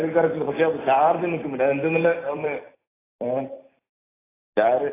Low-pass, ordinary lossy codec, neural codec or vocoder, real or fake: 3.6 kHz; AAC, 16 kbps; vocoder, 44.1 kHz, 128 mel bands every 256 samples, BigVGAN v2; fake